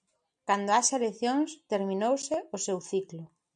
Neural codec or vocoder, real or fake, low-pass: none; real; 9.9 kHz